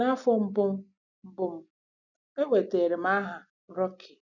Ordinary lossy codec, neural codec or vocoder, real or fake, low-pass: none; none; real; 7.2 kHz